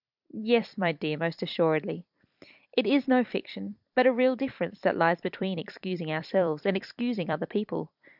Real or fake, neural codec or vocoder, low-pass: fake; vocoder, 44.1 kHz, 128 mel bands every 256 samples, BigVGAN v2; 5.4 kHz